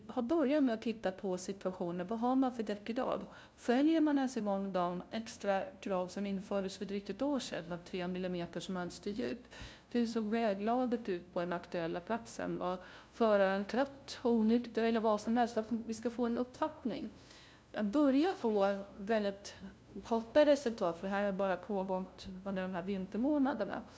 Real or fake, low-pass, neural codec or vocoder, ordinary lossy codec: fake; none; codec, 16 kHz, 0.5 kbps, FunCodec, trained on LibriTTS, 25 frames a second; none